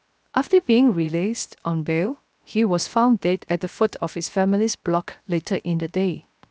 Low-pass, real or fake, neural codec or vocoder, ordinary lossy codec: none; fake; codec, 16 kHz, 0.7 kbps, FocalCodec; none